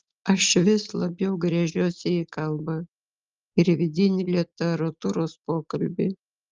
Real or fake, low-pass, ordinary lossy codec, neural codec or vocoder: real; 7.2 kHz; Opus, 32 kbps; none